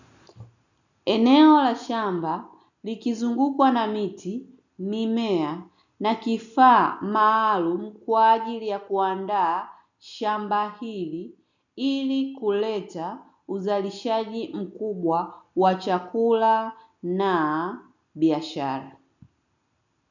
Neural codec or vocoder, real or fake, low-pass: none; real; 7.2 kHz